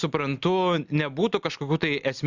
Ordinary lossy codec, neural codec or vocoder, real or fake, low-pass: Opus, 64 kbps; none; real; 7.2 kHz